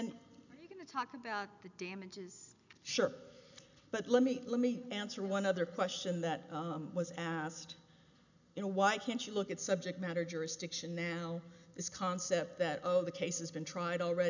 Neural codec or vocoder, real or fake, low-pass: none; real; 7.2 kHz